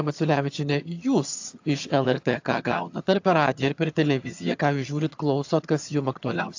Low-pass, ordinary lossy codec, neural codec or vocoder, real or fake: 7.2 kHz; AAC, 48 kbps; vocoder, 22.05 kHz, 80 mel bands, HiFi-GAN; fake